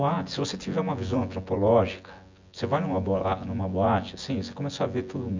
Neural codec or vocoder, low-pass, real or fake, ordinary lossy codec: vocoder, 24 kHz, 100 mel bands, Vocos; 7.2 kHz; fake; MP3, 64 kbps